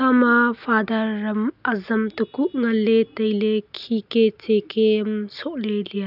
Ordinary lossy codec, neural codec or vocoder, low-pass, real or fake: none; none; 5.4 kHz; real